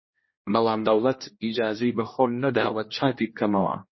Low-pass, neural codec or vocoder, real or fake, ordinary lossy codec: 7.2 kHz; codec, 16 kHz, 1 kbps, X-Codec, HuBERT features, trained on general audio; fake; MP3, 24 kbps